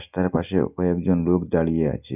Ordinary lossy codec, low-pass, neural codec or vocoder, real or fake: none; 3.6 kHz; none; real